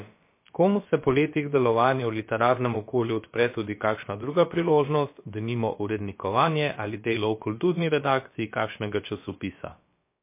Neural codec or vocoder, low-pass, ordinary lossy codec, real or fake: codec, 16 kHz, about 1 kbps, DyCAST, with the encoder's durations; 3.6 kHz; MP3, 24 kbps; fake